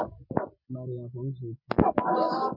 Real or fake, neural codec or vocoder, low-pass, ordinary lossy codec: real; none; 5.4 kHz; MP3, 48 kbps